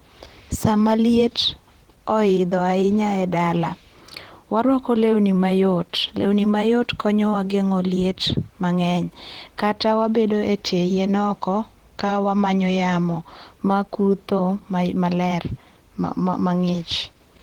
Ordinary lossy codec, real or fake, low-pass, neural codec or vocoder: Opus, 16 kbps; fake; 19.8 kHz; vocoder, 44.1 kHz, 128 mel bands, Pupu-Vocoder